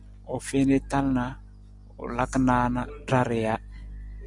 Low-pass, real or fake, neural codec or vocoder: 10.8 kHz; real; none